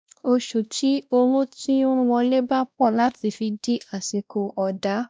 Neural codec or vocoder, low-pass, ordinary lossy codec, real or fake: codec, 16 kHz, 1 kbps, X-Codec, WavLM features, trained on Multilingual LibriSpeech; none; none; fake